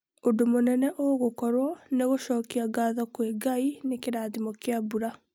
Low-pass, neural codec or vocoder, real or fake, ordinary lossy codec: 19.8 kHz; none; real; none